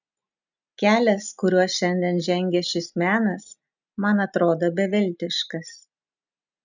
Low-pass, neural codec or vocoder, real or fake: 7.2 kHz; none; real